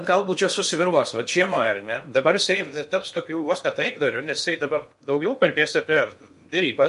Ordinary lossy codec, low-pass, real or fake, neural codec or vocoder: MP3, 64 kbps; 10.8 kHz; fake; codec, 16 kHz in and 24 kHz out, 0.8 kbps, FocalCodec, streaming, 65536 codes